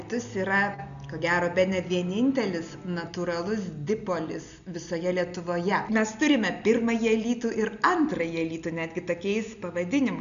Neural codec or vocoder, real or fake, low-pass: none; real; 7.2 kHz